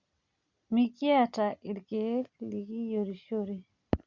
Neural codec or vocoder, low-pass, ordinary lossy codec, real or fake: none; 7.2 kHz; Opus, 64 kbps; real